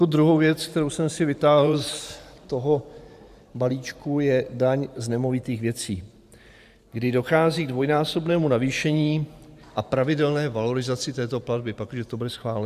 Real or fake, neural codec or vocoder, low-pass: fake; vocoder, 44.1 kHz, 128 mel bands every 512 samples, BigVGAN v2; 14.4 kHz